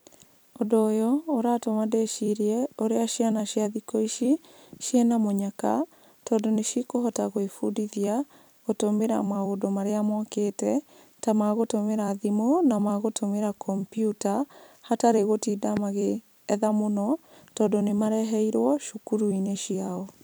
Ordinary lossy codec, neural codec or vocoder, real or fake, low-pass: none; vocoder, 44.1 kHz, 128 mel bands every 256 samples, BigVGAN v2; fake; none